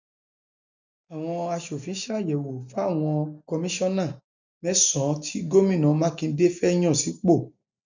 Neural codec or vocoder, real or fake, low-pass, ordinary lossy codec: none; real; 7.2 kHz; none